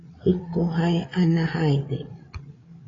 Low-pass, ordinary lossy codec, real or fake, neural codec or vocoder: 7.2 kHz; AAC, 32 kbps; fake; codec, 16 kHz, 8 kbps, FreqCodec, larger model